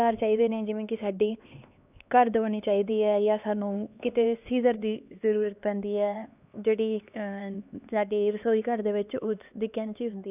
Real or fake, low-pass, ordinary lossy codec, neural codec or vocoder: fake; 3.6 kHz; none; codec, 16 kHz, 2 kbps, X-Codec, HuBERT features, trained on LibriSpeech